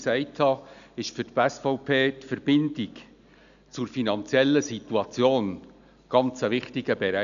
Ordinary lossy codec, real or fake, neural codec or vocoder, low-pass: none; real; none; 7.2 kHz